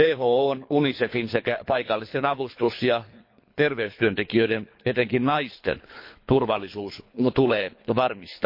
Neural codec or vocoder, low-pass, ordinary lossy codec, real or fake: codec, 24 kHz, 3 kbps, HILCodec; 5.4 kHz; MP3, 32 kbps; fake